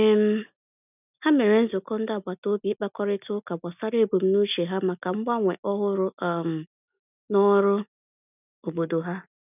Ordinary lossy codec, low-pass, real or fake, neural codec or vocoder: none; 3.6 kHz; real; none